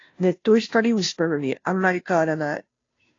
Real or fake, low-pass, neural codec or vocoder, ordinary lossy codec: fake; 7.2 kHz; codec, 16 kHz, 0.5 kbps, FunCodec, trained on Chinese and English, 25 frames a second; AAC, 32 kbps